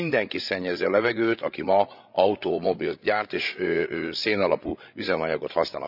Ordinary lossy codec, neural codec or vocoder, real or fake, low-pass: none; codec, 16 kHz, 16 kbps, FreqCodec, larger model; fake; 5.4 kHz